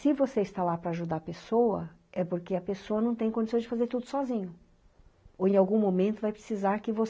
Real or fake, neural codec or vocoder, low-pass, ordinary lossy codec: real; none; none; none